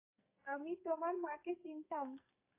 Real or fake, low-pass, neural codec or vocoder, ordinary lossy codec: fake; 3.6 kHz; codec, 44.1 kHz, 2.6 kbps, SNAC; AAC, 24 kbps